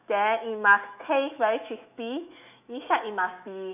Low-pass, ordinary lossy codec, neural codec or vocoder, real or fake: 3.6 kHz; none; autoencoder, 48 kHz, 128 numbers a frame, DAC-VAE, trained on Japanese speech; fake